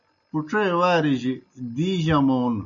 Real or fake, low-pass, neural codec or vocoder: real; 7.2 kHz; none